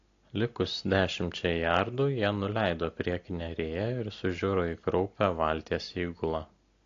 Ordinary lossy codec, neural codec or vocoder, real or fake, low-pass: AAC, 48 kbps; none; real; 7.2 kHz